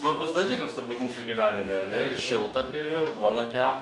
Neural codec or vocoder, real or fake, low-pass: codec, 44.1 kHz, 2.6 kbps, DAC; fake; 10.8 kHz